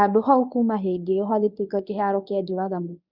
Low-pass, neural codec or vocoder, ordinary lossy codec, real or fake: 5.4 kHz; codec, 24 kHz, 0.9 kbps, WavTokenizer, medium speech release version 1; none; fake